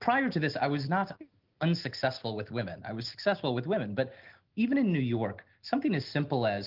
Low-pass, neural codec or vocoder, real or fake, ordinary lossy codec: 5.4 kHz; none; real; Opus, 32 kbps